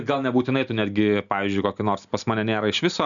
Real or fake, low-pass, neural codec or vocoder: real; 7.2 kHz; none